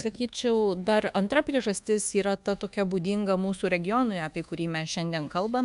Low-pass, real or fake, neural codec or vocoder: 10.8 kHz; fake; codec, 24 kHz, 1.2 kbps, DualCodec